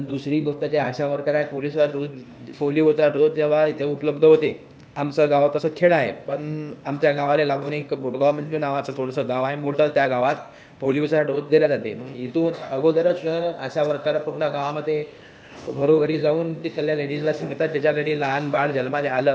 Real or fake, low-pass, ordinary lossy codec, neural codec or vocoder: fake; none; none; codec, 16 kHz, 0.8 kbps, ZipCodec